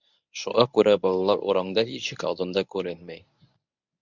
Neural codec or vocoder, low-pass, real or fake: codec, 24 kHz, 0.9 kbps, WavTokenizer, medium speech release version 1; 7.2 kHz; fake